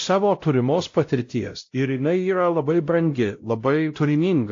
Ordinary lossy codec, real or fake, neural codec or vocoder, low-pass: AAC, 48 kbps; fake; codec, 16 kHz, 0.5 kbps, X-Codec, WavLM features, trained on Multilingual LibriSpeech; 7.2 kHz